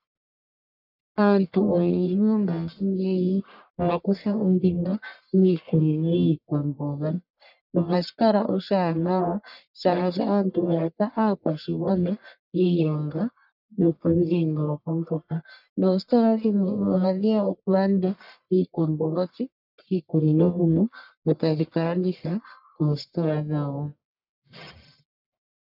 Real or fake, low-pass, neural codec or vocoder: fake; 5.4 kHz; codec, 44.1 kHz, 1.7 kbps, Pupu-Codec